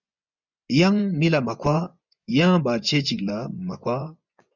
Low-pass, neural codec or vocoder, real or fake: 7.2 kHz; vocoder, 24 kHz, 100 mel bands, Vocos; fake